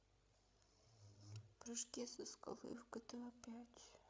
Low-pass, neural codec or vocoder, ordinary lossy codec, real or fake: none; codec, 16 kHz, 16 kbps, FreqCodec, larger model; none; fake